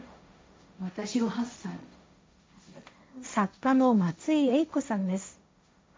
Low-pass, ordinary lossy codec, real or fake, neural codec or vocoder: none; none; fake; codec, 16 kHz, 1.1 kbps, Voila-Tokenizer